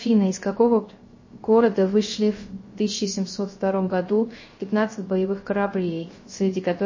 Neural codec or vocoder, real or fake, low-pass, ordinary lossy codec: codec, 16 kHz, 0.3 kbps, FocalCodec; fake; 7.2 kHz; MP3, 32 kbps